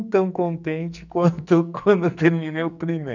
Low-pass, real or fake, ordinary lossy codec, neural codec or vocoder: 7.2 kHz; fake; none; codec, 44.1 kHz, 2.6 kbps, SNAC